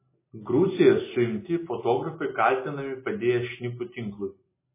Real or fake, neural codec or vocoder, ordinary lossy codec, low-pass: real; none; MP3, 16 kbps; 3.6 kHz